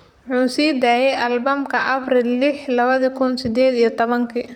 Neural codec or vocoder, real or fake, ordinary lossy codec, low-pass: vocoder, 44.1 kHz, 128 mel bands, Pupu-Vocoder; fake; none; 19.8 kHz